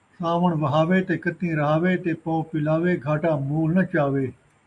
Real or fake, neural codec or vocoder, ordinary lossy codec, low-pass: real; none; MP3, 64 kbps; 10.8 kHz